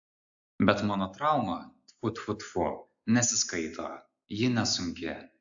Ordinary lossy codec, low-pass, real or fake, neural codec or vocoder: AAC, 64 kbps; 7.2 kHz; real; none